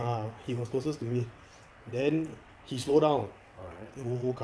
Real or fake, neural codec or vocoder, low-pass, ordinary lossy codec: fake; vocoder, 22.05 kHz, 80 mel bands, WaveNeXt; none; none